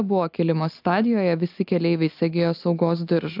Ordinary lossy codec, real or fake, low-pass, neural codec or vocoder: AAC, 48 kbps; real; 5.4 kHz; none